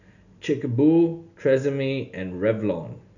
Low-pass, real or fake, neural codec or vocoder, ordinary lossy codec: 7.2 kHz; real; none; none